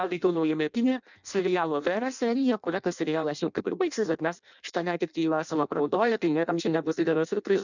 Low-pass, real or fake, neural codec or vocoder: 7.2 kHz; fake; codec, 16 kHz in and 24 kHz out, 0.6 kbps, FireRedTTS-2 codec